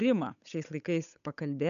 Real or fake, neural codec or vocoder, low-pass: fake; codec, 16 kHz, 8 kbps, FunCodec, trained on Chinese and English, 25 frames a second; 7.2 kHz